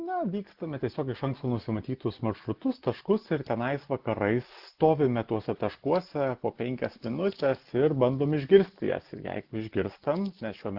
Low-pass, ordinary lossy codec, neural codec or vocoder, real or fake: 5.4 kHz; Opus, 16 kbps; none; real